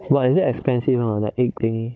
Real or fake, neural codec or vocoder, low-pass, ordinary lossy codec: fake; codec, 16 kHz, 16 kbps, FunCodec, trained on Chinese and English, 50 frames a second; none; none